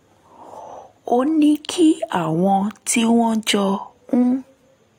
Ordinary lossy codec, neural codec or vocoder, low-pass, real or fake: AAC, 48 kbps; none; 19.8 kHz; real